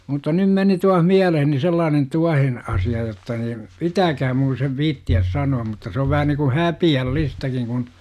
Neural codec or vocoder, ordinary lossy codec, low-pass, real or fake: none; none; 14.4 kHz; real